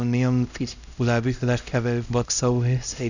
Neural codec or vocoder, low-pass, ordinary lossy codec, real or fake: codec, 16 kHz, 0.5 kbps, X-Codec, HuBERT features, trained on LibriSpeech; 7.2 kHz; none; fake